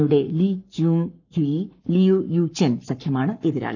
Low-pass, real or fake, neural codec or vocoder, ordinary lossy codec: 7.2 kHz; fake; codec, 44.1 kHz, 7.8 kbps, Pupu-Codec; none